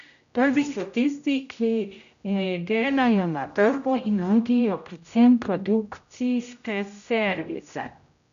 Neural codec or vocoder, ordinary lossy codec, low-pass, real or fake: codec, 16 kHz, 0.5 kbps, X-Codec, HuBERT features, trained on general audio; none; 7.2 kHz; fake